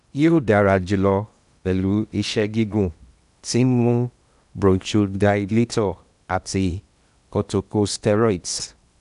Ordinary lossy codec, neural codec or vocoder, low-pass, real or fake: none; codec, 16 kHz in and 24 kHz out, 0.8 kbps, FocalCodec, streaming, 65536 codes; 10.8 kHz; fake